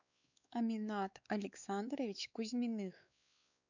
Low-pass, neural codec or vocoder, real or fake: 7.2 kHz; codec, 16 kHz, 4 kbps, X-Codec, WavLM features, trained on Multilingual LibriSpeech; fake